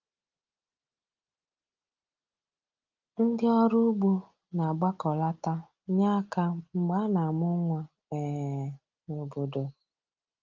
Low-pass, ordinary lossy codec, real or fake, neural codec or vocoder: 7.2 kHz; Opus, 32 kbps; real; none